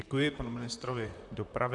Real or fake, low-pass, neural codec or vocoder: fake; 10.8 kHz; vocoder, 44.1 kHz, 128 mel bands, Pupu-Vocoder